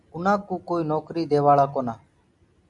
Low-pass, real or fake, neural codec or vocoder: 10.8 kHz; real; none